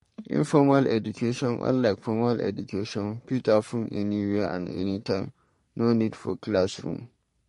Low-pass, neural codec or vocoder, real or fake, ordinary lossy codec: 14.4 kHz; codec, 44.1 kHz, 3.4 kbps, Pupu-Codec; fake; MP3, 48 kbps